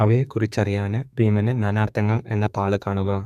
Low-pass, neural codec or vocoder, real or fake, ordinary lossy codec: 14.4 kHz; codec, 32 kHz, 1.9 kbps, SNAC; fake; none